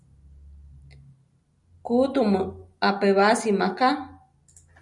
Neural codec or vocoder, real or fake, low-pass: none; real; 10.8 kHz